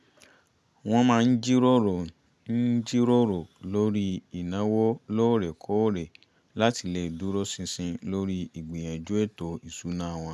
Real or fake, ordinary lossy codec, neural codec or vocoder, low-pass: real; none; none; none